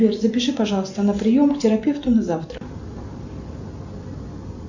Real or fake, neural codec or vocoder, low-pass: real; none; 7.2 kHz